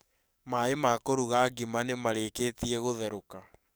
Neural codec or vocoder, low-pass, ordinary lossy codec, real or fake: codec, 44.1 kHz, 7.8 kbps, DAC; none; none; fake